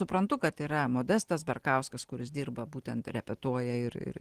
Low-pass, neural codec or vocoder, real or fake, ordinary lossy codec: 14.4 kHz; none; real; Opus, 16 kbps